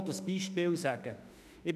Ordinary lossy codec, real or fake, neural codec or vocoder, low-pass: none; fake; autoencoder, 48 kHz, 32 numbers a frame, DAC-VAE, trained on Japanese speech; 14.4 kHz